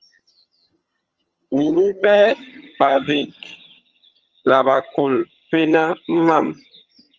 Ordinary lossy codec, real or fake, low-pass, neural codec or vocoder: Opus, 24 kbps; fake; 7.2 kHz; vocoder, 22.05 kHz, 80 mel bands, HiFi-GAN